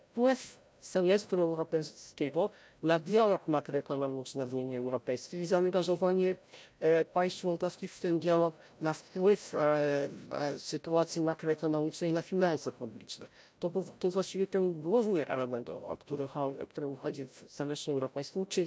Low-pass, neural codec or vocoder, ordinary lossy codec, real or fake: none; codec, 16 kHz, 0.5 kbps, FreqCodec, larger model; none; fake